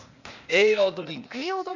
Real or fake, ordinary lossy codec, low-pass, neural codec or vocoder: fake; none; 7.2 kHz; codec, 16 kHz, 0.8 kbps, ZipCodec